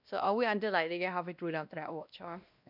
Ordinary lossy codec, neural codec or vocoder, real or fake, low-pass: none; codec, 16 kHz, 1 kbps, X-Codec, WavLM features, trained on Multilingual LibriSpeech; fake; 5.4 kHz